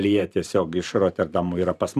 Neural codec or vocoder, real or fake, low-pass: vocoder, 44.1 kHz, 128 mel bands every 512 samples, BigVGAN v2; fake; 14.4 kHz